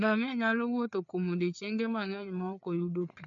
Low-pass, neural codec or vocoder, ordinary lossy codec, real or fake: 7.2 kHz; codec, 16 kHz, 4 kbps, FreqCodec, larger model; none; fake